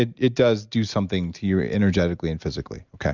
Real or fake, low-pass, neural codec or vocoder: real; 7.2 kHz; none